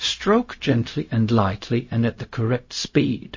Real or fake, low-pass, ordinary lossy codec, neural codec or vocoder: fake; 7.2 kHz; MP3, 32 kbps; codec, 16 kHz, 0.4 kbps, LongCat-Audio-Codec